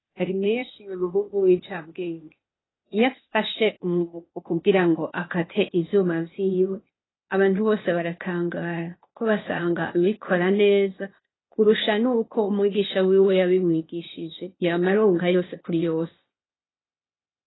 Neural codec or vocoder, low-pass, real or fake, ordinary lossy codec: codec, 16 kHz, 0.8 kbps, ZipCodec; 7.2 kHz; fake; AAC, 16 kbps